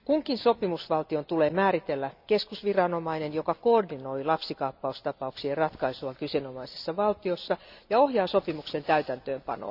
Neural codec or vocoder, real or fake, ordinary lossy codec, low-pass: none; real; none; 5.4 kHz